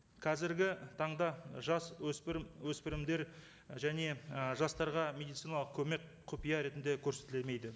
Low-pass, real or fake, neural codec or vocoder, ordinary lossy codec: none; real; none; none